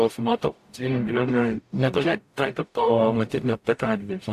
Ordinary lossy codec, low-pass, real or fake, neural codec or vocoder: AAC, 64 kbps; 14.4 kHz; fake; codec, 44.1 kHz, 0.9 kbps, DAC